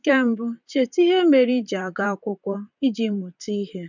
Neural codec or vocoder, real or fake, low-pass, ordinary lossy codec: vocoder, 44.1 kHz, 128 mel bands, Pupu-Vocoder; fake; 7.2 kHz; none